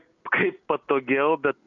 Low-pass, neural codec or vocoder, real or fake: 7.2 kHz; none; real